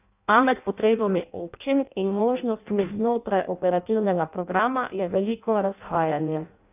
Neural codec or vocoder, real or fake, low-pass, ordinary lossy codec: codec, 16 kHz in and 24 kHz out, 0.6 kbps, FireRedTTS-2 codec; fake; 3.6 kHz; none